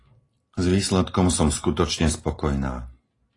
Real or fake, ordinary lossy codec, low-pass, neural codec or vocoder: real; AAC, 32 kbps; 10.8 kHz; none